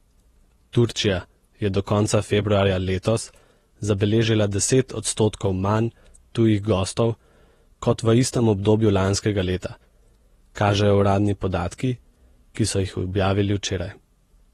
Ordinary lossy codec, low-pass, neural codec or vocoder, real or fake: AAC, 32 kbps; 19.8 kHz; vocoder, 48 kHz, 128 mel bands, Vocos; fake